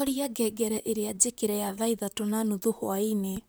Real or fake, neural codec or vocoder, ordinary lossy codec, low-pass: fake; vocoder, 44.1 kHz, 128 mel bands, Pupu-Vocoder; none; none